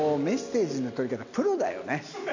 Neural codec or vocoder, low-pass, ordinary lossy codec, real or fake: none; 7.2 kHz; AAC, 48 kbps; real